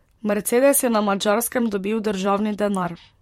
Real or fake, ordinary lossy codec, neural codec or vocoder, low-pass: fake; MP3, 64 kbps; vocoder, 44.1 kHz, 128 mel bands, Pupu-Vocoder; 19.8 kHz